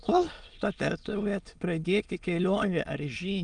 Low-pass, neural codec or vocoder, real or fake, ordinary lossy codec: 9.9 kHz; autoencoder, 22.05 kHz, a latent of 192 numbers a frame, VITS, trained on many speakers; fake; Opus, 32 kbps